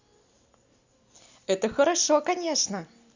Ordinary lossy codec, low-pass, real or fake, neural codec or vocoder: Opus, 64 kbps; 7.2 kHz; real; none